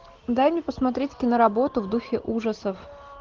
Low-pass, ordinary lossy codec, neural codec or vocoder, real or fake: 7.2 kHz; Opus, 16 kbps; none; real